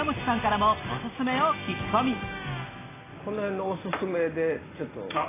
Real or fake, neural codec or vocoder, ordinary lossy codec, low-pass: real; none; AAC, 16 kbps; 3.6 kHz